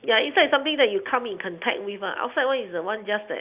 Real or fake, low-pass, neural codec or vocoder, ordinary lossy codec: real; 3.6 kHz; none; Opus, 64 kbps